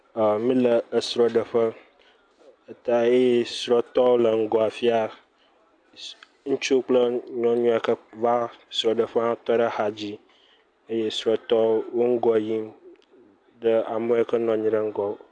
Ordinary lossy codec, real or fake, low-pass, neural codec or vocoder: AAC, 64 kbps; real; 9.9 kHz; none